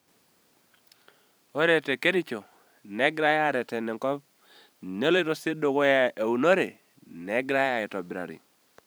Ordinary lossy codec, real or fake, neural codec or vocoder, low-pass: none; fake; vocoder, 44.1 kHz, 128 mel bands every 512 samples, BigVGAN v2; none